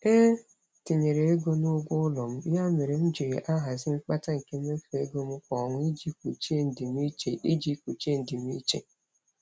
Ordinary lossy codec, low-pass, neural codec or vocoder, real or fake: none; none; none; real